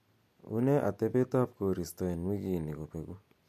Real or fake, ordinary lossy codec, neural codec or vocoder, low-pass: real; MP3, 64 kbps; none; 14.4 kHz